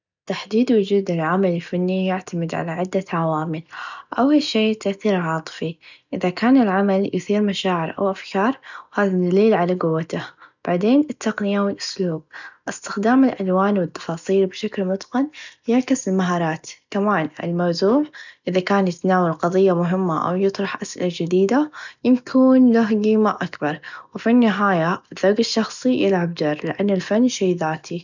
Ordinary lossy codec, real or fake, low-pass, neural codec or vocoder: none; real; 7.2 kHz; none